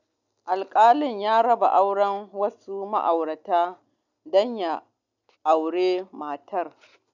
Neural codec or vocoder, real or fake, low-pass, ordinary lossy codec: none; real; 7.2 kHz; none